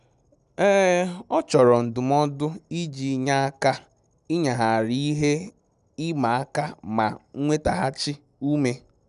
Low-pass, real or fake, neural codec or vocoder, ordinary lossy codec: 10.8 kHz; real; none; none